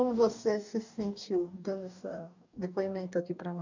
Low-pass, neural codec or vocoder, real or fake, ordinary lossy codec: 7.2 kHz; codec, 44.1 kHz, 2.6 kbps, DAC; fake; none